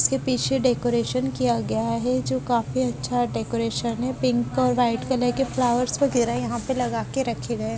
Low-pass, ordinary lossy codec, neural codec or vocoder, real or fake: none; none; none; real